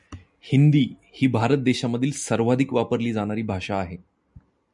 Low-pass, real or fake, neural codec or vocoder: 10.8 kHz; real; none